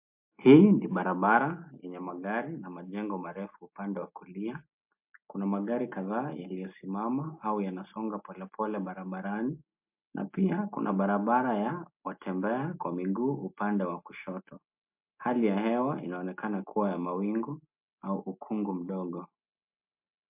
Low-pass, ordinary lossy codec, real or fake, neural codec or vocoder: 3.6 kHz; MP3, 32 kbps; real; none